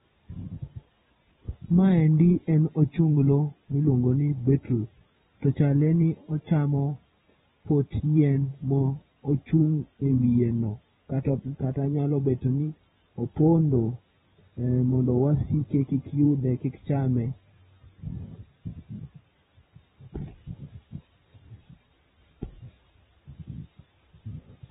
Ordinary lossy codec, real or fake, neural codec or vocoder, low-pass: AAC, 16 kbps; fake; vocoder, 44.1 kHz, 128 mel bands every 512 samples, BigVGAN v2; 19.8 kHz